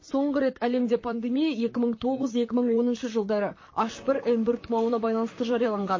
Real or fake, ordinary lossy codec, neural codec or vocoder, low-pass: fake; MP3, 32 kbps; vocoder, 44.1 kHz, 128 mel bands, Pupu-Vocoder; 7.2 kHz